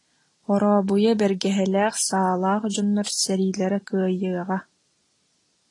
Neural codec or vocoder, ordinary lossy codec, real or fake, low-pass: none; AAC, 32 kbps; real; 10.8 kHz